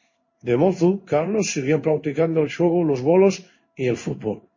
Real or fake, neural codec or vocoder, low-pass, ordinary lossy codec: fake; codec, 16 kHz in and 24 kHz out, 1 kbps, XY-Tokenizer; 7.2 kHz; MP3, 32 kbps